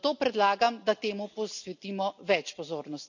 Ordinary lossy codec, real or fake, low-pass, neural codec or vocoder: none; real; 7.2 kHz; none